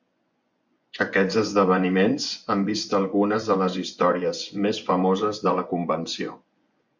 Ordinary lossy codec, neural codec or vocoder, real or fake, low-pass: MP3, 64 kbps; vocoder, 24 kHz, 100 mel bands, Vocos; fake; 7.2 kHz